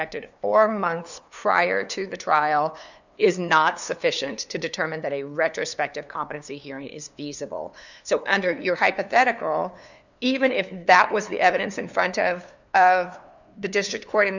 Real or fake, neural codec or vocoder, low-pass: fake; codec, 16 kHz, 2 kbps, FunCodec, trained on LibriTTS, 25 frames a second; 7.2 kHz